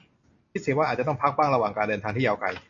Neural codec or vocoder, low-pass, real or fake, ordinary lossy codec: none; 7.2 kHz; real; AAC, 48 kbps